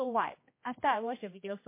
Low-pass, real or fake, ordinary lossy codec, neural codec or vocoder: 3.6 kHz; fake; MP3, 24 kbps; codec, 16 kHz, 1 kbps, X-Codec, HuBERT features, trained on general audio